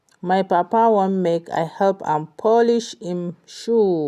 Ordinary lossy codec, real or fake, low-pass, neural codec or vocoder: none; real; 14.4 kHz; none